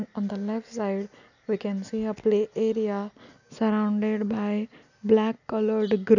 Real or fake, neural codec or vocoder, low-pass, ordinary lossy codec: real; none; 7.2 kHz; none